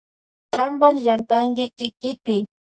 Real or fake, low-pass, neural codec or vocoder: fake; 9.9 kHz; codec, 24 kHz, 0.9 kbps, WavTokenizer, medium music audio release